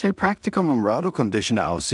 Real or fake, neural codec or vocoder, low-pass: fake; codec, 16 kHz in and 24 kHz out, 0.4 kbps, LongCat-Audio-Codec, two codebook decoder; 10.8 kHz